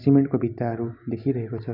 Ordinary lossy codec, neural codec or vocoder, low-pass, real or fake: none; none; 5.4 kHz; real